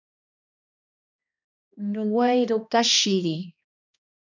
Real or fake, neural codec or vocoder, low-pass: fake; codec, 16 kHz, 1 kbps, X-Codec, HuBERT features, trained on balanced general audio; 7.2 kHz